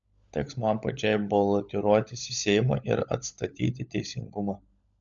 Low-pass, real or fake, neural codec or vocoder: 7.2 kHz; fake; codec, 16 kHz, 16 kbps, FunCodec, trained on LibriTTS, 50 frames a second